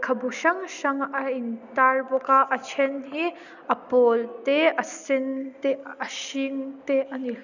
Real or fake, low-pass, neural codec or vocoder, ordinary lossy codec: real; 7.2 kHz; none; none